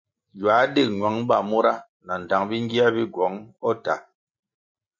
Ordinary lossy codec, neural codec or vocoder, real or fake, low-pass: MP3, 48 kbps; none; real; 7.2 kHz